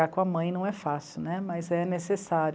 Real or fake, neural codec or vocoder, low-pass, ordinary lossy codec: real; none; none; none